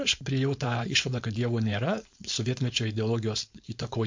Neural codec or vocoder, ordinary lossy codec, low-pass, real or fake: codec, 16 kHz, 4.8 kbps, FACodec; MP3, 48 kbps; 7.2 kHz; fake